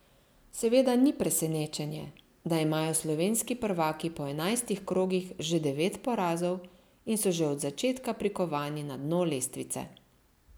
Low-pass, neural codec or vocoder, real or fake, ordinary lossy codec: none; none; real; none